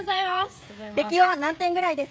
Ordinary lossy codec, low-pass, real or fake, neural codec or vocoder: none; none; fake; codec, 16 kHz, 16 kbps, FreqCodec, smaller model